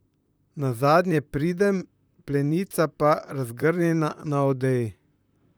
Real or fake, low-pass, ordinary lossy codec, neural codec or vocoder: fake; none; none; vocoder, 44.1 kHz, 128 mel bands, Pupu-Vocoder